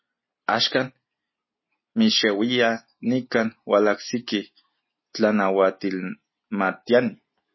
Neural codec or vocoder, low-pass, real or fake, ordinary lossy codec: none; 7.2 kHz; real; MP3, 24 kbps